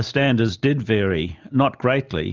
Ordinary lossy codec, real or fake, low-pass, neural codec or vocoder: Opus, 32 kbps; real; 7.2 kHz; none